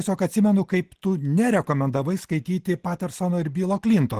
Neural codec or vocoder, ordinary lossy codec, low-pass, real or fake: none; Opus, 16 kbps; 14.4 kHz; real